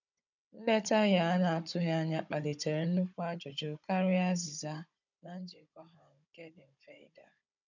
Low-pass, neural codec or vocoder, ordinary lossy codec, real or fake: 7.2 kHz; codec, 16 kHz, 16 kbps, FunCodec, trained on Chinese and English, 50 frames a second; none; fake